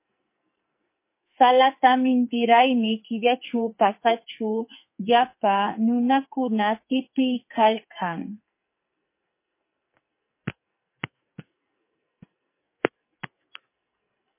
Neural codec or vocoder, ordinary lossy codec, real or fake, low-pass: codec, 44.1 kHz, 2.6 kbps, SNAC; MP3, 24 kbps; fake; 3.6 kHz